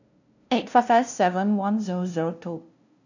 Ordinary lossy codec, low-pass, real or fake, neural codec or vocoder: none; 7.2 kHz; fake; codec, 16 kHz, 0.5 kbps, FunCodec, trained on LibriTTS, 25 frames a second